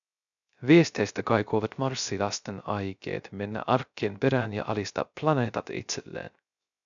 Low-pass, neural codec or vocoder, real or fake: 7.2 kHz; codec, 16 kHz, 0.3 kbps, FocalCodec; fake